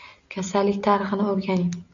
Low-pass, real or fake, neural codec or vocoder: 7.2 kHz; real; none